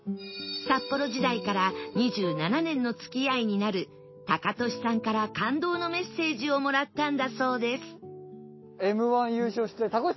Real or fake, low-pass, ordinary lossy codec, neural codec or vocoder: real; 7.2 kHz; MP3, 24 kbps; none